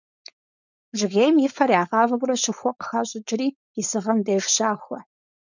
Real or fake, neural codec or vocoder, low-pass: fake; codec, 16 kHz, 4.8 kbps, FACodec; 7.2 kHz